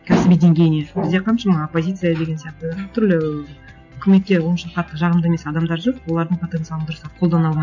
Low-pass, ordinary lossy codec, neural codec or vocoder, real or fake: 7.2 kHz; none; none; real